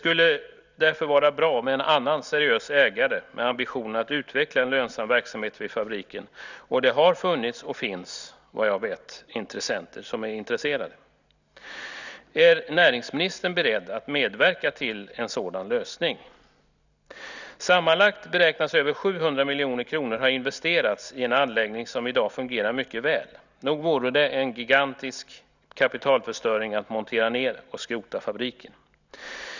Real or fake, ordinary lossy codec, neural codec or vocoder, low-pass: real; none; none; 7.2 kHz